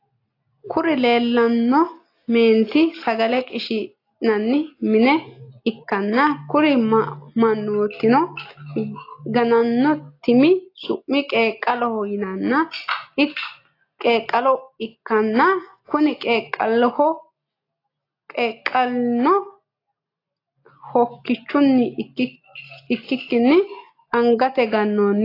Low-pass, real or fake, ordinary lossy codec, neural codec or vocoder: 5.4 kHz; real; AAC, 32 kbps; none